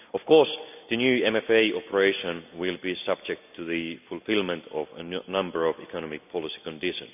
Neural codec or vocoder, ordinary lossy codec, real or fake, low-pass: none; none; real; 3.6 kHz